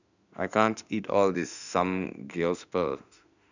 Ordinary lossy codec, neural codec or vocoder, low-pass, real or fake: none; autoencoder, 48 kHz, 32 numbers a frame, DAC-VAE, trained on Japanese speech; 7.2 kHz; fake